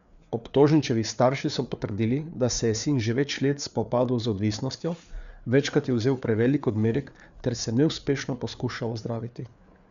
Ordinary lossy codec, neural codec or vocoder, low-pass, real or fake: none; codec, 16 kHz, 4 kbps, FreqCodec, larger model; 7.2 kHz; fake